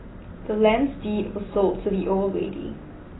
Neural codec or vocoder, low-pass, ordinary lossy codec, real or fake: none; 7.2 kHz; AAC, 16 kbps; real